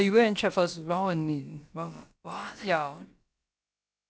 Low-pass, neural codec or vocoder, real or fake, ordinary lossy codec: none; codec, 16 kHz, about 1 kbps, DyCAST, with the encoder's durations; fake; none